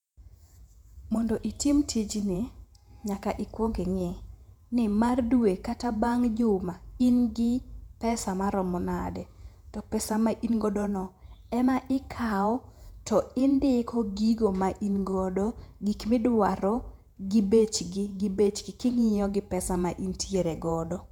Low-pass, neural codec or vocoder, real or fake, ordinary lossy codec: 19.8 kHz; vocoder, 48 kHz, 128 mel bands, Vocos; fake; none